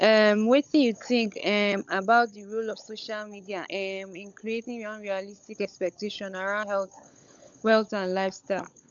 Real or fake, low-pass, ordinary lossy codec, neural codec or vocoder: fake; 7.2 kHz; none; codec, 16 kHz, 16 kbps, FunCodec, trained on LibriTTS, 50 frames a second